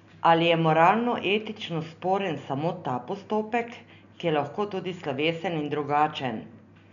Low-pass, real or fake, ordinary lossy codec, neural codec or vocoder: 7.2 kHz; real; none; none